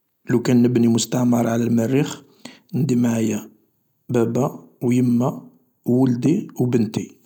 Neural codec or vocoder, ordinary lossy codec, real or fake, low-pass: none; none; real; 19.8 kHz